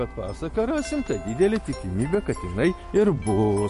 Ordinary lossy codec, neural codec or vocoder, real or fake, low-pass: MP3, 48 kbps; none; real; 10.8 kHz